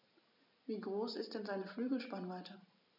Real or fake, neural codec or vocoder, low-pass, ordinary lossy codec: real; none; 5.4 kHz; none